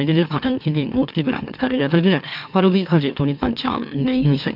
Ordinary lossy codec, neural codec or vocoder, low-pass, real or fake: AAC, 48 kbps; autoencoder, 44.1 kHz, a latent of 192 numbers a frame, MeloTTS; 5.4 kHz; fake